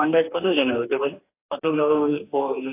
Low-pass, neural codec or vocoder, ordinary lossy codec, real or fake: 3.6 kHz; codec, 44.1 kHz, 2.6 kbps, DAC; AAC, 24 kbps; fake